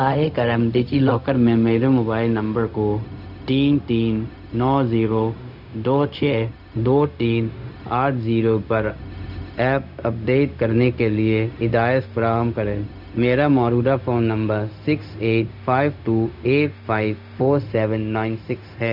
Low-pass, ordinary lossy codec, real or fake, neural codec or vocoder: 5.4 kHz; none; fake; codec, 16 kHz, 0.4 kbps, LongCat-Audio-Codec